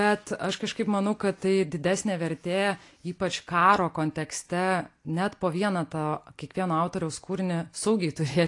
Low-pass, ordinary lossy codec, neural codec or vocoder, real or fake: 10.8 kHz; AAC, 48 kbps; none; real